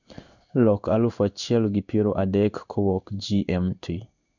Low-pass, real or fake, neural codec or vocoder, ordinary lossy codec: 7.2 kHz; fake; codec, 16 kHz in and 24 kHz out, 1 kbps, XY-Tokenizer; none